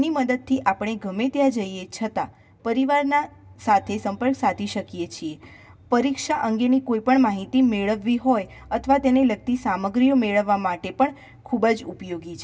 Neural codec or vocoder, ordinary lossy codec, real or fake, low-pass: none; none; real; none